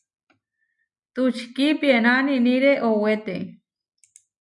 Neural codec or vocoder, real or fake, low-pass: none; real; 10.8 kHz